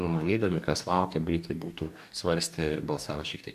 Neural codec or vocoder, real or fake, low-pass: codec, 44.1 kHz, 2.6 kbps, DAC; fake; 14.4 kHz